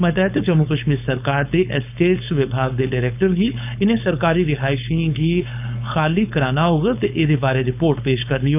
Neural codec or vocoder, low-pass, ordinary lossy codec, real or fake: codec, 16 kHz, 4.8 kbps, FACodec; 3.6 kHz; none; fake